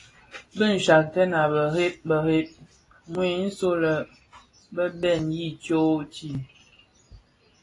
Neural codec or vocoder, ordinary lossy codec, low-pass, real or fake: none; AAC, 32 kbps; 10.8 kHz; real